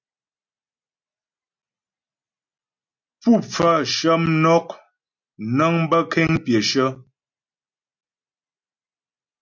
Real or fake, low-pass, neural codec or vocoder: real; 7.2 kHz; none